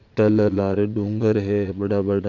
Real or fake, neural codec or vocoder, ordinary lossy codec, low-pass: fake; vocoder, 22.05 kHz, 80 mel bands, Vocos; none; 7.2 kHz